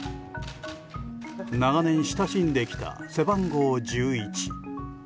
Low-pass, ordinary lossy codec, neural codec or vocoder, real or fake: none; none; none; real